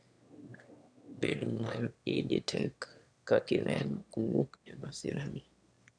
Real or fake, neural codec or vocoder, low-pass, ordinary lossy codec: fake; autoencoder, 22.05 kHz, a latent of 192 numbers a frame, VITS, trained on one speaker; 9.9 kHz; none